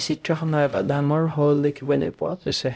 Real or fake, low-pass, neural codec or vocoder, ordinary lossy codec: fake; none; codec, 16 kHz, 0.5 kbps, X-Codec, HuBERT features, trained on LibriSpeech; none